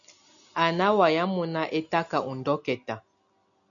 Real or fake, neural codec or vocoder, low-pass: real; none; 7.2 kHz